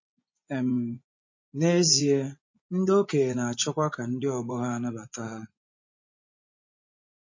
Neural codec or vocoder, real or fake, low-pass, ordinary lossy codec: vocoder, 24 kHz, 100 mel bands, Vocos; fake; 7.2 kHz; MP3, 32 kbps